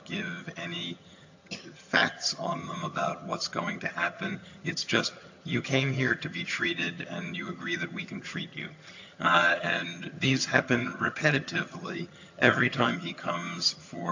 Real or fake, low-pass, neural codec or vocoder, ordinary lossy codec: fake; 7.2 kHz; vocoder, 22.05 kHz, 80 mel bands, HiFi-GAN; AAC, 48 kbps